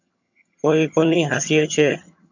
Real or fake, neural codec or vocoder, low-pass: fake; vocoder, 22.05 kHz, 80 mel bands, HiFi-GAN; 7.2 kHz